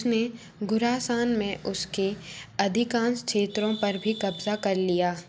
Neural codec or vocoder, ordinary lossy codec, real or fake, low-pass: none; none; real; none